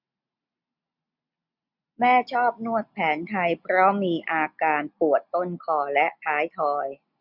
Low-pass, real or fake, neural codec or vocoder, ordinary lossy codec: 5.4 kHz; real; none; none